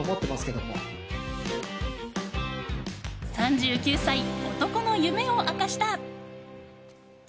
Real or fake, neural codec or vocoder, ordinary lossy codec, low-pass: real; none; none; none